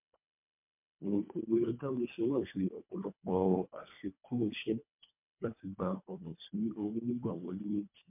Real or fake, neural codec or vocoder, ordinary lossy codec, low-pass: fake; codec, 24 kHz, 1.5 kbps, HILCodec; MP3, 32 kbps; 3.6 kHz